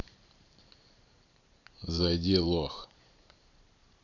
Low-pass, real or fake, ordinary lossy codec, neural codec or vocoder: 7.2 kHz; fake; none; vocoder, 44.1 kHz, 128 mel bands every 512 samples, BigVGAN v2